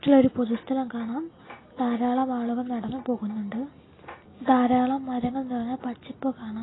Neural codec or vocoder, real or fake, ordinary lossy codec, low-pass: none; real; AAC, 16 kbps; 7.2 kHz